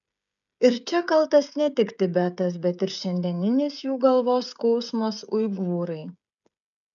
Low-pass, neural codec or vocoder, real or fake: 7.2 kHz; codec, 16 kHz, 16 kbps, FreqCodec, smaller model; fake